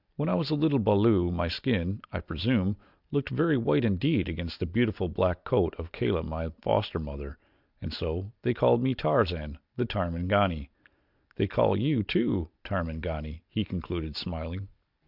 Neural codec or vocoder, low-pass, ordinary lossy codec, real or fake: none; 5.4 kHz; Opus, 64 kbps; real